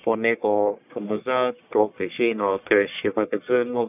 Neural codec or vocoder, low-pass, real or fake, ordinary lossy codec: codec, 44.1 kHz, 1.7 kbps, Pupu-Codec; 3.6 kHz; fake; none